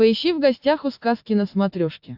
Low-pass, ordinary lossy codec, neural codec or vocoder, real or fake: 5.4 kHz; AAC, 48 kbps; none; real